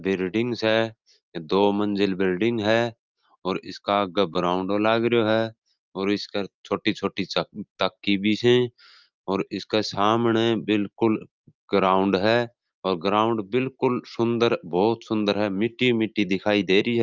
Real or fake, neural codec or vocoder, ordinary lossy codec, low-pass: real; none; Opus, 24 kbps; 7.2 kHz